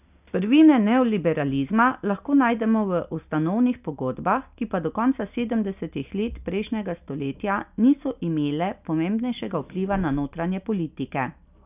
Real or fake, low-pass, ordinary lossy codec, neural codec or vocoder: real; 3.6 kHz; none; none